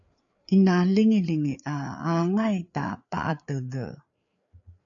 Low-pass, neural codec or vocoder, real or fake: 7.2 kHz; codec, 16 kHz, 4 kbps, FreqCodec, larger model; fake